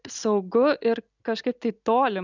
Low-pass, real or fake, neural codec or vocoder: 7.2 kHz; real; none